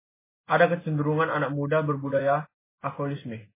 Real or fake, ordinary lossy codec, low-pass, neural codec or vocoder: real; MP3, 16 kbps; 3.6 kHz; none